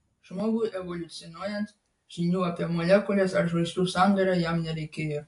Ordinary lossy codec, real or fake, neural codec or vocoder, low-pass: AAC, 48 kbps; real; none; 10.8 kHz